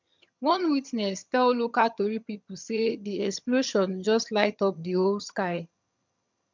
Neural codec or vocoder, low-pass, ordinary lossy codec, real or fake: vocoder, 22.05 kHz, 80 mel bands, HiFi-GAN; 7.2 kHz; MP3, 64 kbps; fake